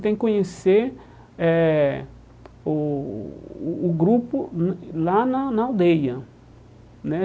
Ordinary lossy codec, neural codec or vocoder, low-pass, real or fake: none; none; none; real